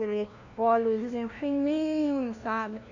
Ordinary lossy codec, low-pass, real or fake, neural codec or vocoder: none; 7.2 kHz; fake; codec, 16 kHz, 1 kbps, FunCodec, trained on LibriTTS, 50 frames a second